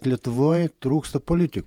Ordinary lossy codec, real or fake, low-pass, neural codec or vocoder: MP3, 96 kbps; fake; 19.8 kHz; vocoder, 48 kHz, 128 mel bands, Vocos